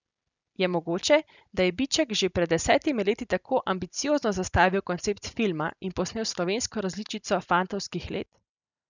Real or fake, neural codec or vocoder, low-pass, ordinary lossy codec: real; none; 7.2 kHz; none